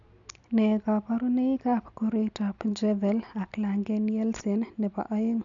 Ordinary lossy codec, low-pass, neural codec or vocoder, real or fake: none; 7.2 kHz; none; real